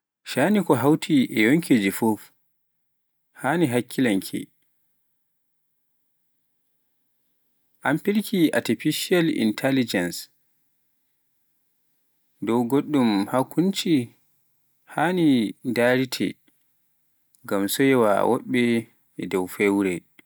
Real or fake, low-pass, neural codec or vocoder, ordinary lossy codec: real; none; none; none